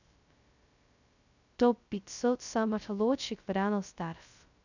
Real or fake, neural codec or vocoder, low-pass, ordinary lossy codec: fake; codec, 16 kHz, 0.2 kbps, FocalCodec; 7.2 kHz; none